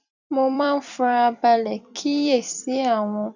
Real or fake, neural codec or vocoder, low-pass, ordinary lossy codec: fake; vocoder, 44.1 kHz, 128 mel bands every 256 samples, BigVGAN v2; 7.2 kHz; none